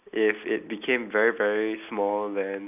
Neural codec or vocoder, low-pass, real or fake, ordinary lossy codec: none; 3.6 kHz; real; none